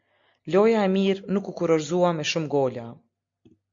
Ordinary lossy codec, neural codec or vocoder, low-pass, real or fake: MP3, 48 kbps; none; 7.2 kHz; real